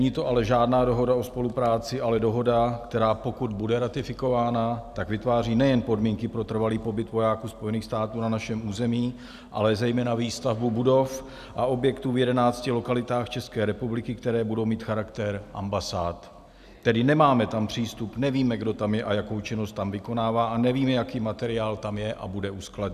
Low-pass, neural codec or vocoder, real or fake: 14.4 kHz; none; real